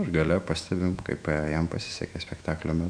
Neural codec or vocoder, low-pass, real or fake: autoencoder, 48 kHz, 128 numbers a frame, DAC-VAE, trained on Japanese speech; 9.9 kHz; fake